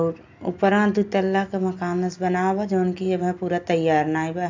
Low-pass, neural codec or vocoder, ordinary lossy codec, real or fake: 7.2 kHz; none; AAC, 48 kbps; real